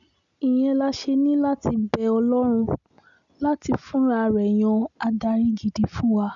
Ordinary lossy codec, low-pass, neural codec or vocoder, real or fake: none; 7.2 kHz; none; real